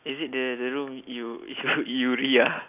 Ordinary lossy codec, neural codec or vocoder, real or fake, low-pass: none; none; real; 3.6 kHz